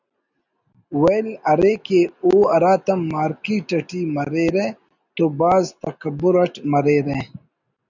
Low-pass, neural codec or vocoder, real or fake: 7.2 kHz; none; real